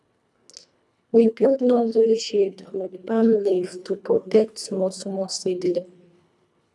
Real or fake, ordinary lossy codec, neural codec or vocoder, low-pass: fake; none; codec, 24 kHz, 1.5 kbps, HILCodec; none